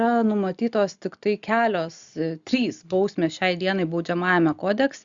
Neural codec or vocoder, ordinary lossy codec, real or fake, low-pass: none; Opus, 64 kbps; real; 7.2 kHz